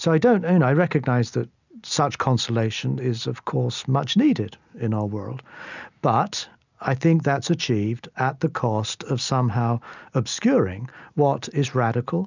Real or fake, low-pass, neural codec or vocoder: real; 7.2 kHz; none